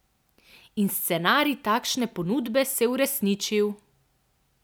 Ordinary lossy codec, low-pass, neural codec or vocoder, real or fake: none; none; none; real